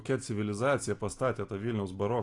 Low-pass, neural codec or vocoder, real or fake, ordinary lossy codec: 10.8 kHz; none; real; AAC, 48 kbps